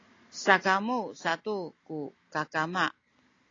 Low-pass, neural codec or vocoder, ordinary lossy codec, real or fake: 7.2 kHz; none; AAC, 32 kbps; real